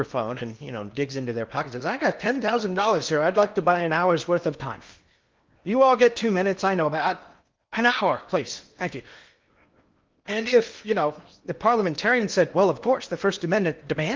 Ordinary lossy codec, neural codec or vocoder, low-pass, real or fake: Opus, 32 kbps; codec, 16 kHz in and 24 kHz out, 0.8 kbps, FocalCodec, streaming, 65536 codes; 7.2 kHz; fake